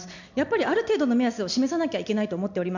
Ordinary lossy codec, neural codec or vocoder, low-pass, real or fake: none; none; 7.2 kHz; real